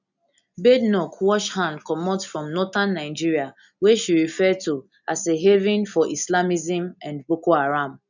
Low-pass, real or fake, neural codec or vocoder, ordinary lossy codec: 7.2 kHz; real; none; none